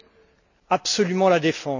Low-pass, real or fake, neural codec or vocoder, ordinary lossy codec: 7.2 kHz; real; none; none